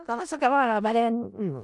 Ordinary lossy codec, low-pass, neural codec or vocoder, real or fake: none; 10.8 kHz; codec, 16 kHz in and 24 kHz out, 0.4 kbps, LongCat-Audio-Codec, four codebook decoder; fake